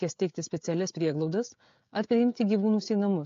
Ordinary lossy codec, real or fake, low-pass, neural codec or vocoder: AAC, 48 kbps; fake; 7.2 kHz; codec, 16 kHz, 16 kbps, FreqCodec, smaller model